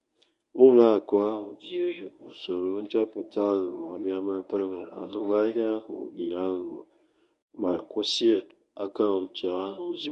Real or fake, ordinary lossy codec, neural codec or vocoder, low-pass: fake; none; codec, 24 kHz, 0.9 kbps, WavTokenizer, medium speech release version 1; 10.8 kHz